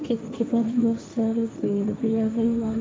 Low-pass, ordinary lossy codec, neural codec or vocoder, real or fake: none; none; codec, 16 kHz, 1.1 kbps, Voila-Tokenizer; fake